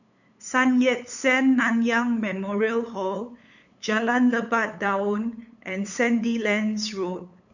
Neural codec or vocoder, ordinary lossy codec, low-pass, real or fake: codec, 16 kHz, 8 kbps, FunCodec, trained on LibriTTS, 25 frames a second; none; 7.2 kHz; fake